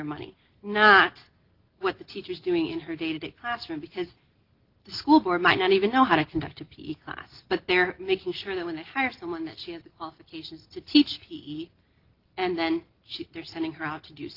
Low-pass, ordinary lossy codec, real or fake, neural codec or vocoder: 5.4 kHz; Opus, 16 kbps; real; none